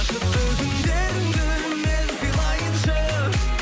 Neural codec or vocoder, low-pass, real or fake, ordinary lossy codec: none; none; real; none